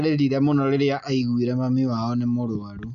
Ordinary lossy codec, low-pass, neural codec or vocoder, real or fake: none; 7.2 kHz; none; real